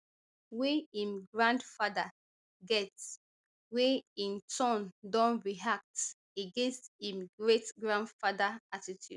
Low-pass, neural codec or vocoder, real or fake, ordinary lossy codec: 10.8 kHz; none; real; none